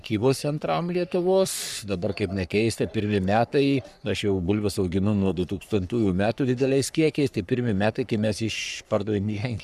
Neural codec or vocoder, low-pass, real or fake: codec, 44.1 kHz, 3.4 kbps, Pupu-Codec; 14.4 kHz; fake